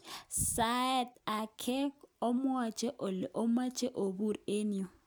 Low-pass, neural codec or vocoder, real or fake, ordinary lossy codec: none; none; real; none